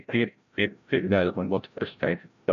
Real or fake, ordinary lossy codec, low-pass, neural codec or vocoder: fake; MP3, 96 kbps; 7.2 kHz; codec, 16 kHz, 0.5 kbps, FreqCodec, larger model